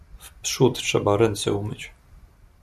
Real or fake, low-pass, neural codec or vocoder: real; 14.4 kHz; none